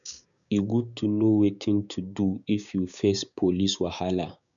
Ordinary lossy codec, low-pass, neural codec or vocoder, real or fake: none; 7.2 kHz; codec, 16 kHz, 6 kbps, DAC; fake